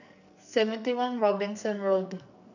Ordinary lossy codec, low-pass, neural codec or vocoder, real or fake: none; 7.2 kHz; codec, 44.1 kHz, 2.6 kbps, SNAC; fake